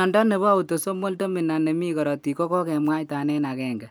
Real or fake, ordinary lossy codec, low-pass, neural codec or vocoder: real; none; none; none